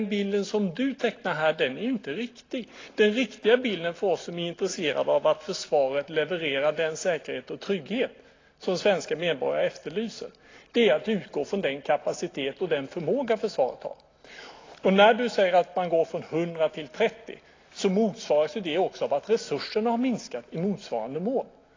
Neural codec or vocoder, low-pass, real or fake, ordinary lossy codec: none; 7.2 kHz; real; AAC, 32 kbps